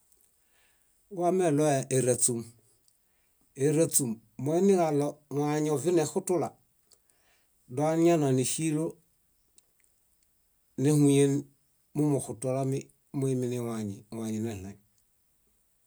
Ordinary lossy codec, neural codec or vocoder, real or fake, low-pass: none; none; real; none